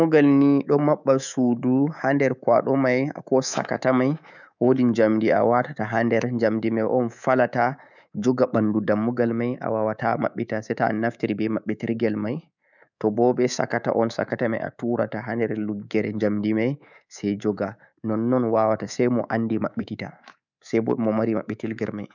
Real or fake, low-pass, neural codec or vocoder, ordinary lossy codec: fake; 7.2 kHz; codec, 24 kHz, 3.1 kbps, DualCodec; none